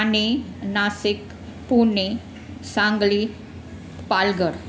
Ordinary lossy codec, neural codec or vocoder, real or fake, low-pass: none; none; real; none